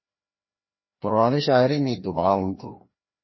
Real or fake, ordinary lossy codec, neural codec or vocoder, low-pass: fake; MP3, 24 kbps; codec, 16 kHz, 1 kbps, FreqCodec, larger model; 7.2 kHz